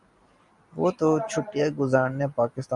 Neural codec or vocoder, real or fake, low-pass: none; real; 10.8 kHz